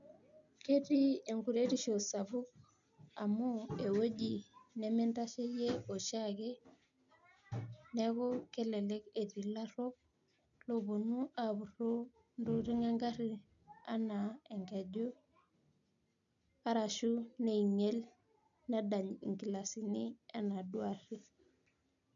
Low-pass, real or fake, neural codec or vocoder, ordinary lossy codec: 7.2 kHz; real; none; MP3, 96 kbps